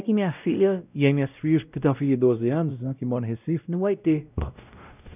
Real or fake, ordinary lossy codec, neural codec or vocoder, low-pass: fake; none; codec, 16 kHz, 0.5 kbps, X-Codec, WavLM features, trained on Multilingual LibriSpeech; 3.6 kHz